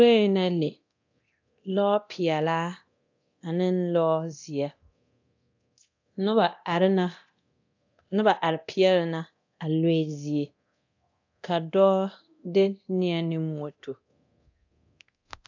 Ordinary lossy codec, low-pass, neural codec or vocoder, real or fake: AAC, 48 kbps; 7.2 kHz; codec, 24 kHz, 0.9 kbps, DualCodec; fake